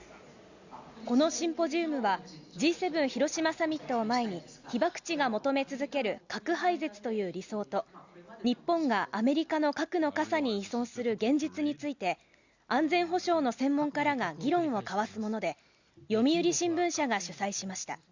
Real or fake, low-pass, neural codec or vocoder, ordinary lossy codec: real; 7.2 kHz; none; Opus, 64 kbps